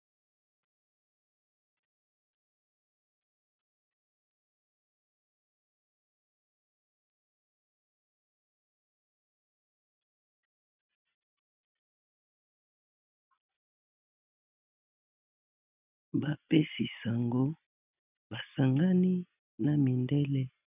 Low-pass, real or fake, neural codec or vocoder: 3.6 kHz; real; none